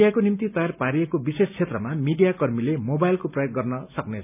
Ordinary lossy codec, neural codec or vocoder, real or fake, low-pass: none; none; real; 3.6 kHz